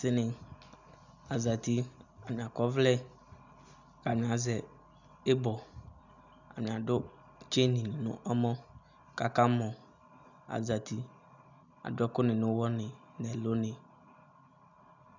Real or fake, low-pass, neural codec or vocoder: real; 7.2 kHz; none